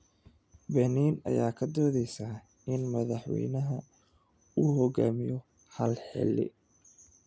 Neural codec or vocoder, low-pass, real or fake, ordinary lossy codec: none; none; real; none